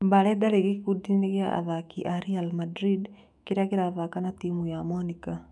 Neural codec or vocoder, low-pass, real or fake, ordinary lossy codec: autoencoder, 48 kHz, 128 numbers a frame, DAC-VAE, trained on Japanese speech; 10.8 kHz; fake; MP3, 96 kbps